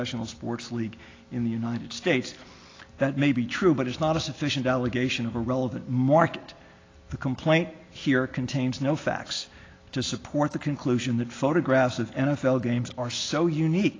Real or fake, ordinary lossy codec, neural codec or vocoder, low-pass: real; AAC, 32 kbps; none; 7.2 kHz